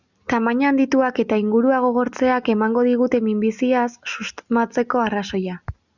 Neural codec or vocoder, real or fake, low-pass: none; real; 7.2 kHz